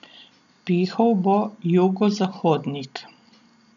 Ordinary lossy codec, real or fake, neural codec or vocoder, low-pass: none; real; none; 7.2 kHz